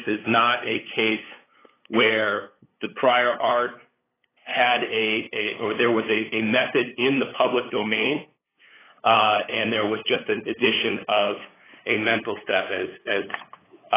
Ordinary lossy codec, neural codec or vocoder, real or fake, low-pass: AAC, 16 kbps; codec, 16 kHz, 8 kbps, FunCodec, trained on LibriTTS, 25 frames a second; fake; 3.6 kHz